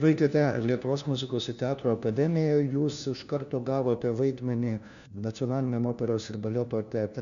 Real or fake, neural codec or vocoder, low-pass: fake; codec, 16 kHz, 1 kbps, FunCodec, trained on LibriTTS, 50 frames a second; 7.2 kHz